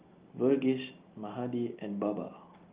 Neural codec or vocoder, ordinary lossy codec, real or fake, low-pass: none; Opus, 32 kbps; real; 3.6 kHz